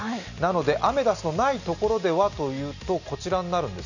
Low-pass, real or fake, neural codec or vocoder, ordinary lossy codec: 7.2 kHz; real; none; none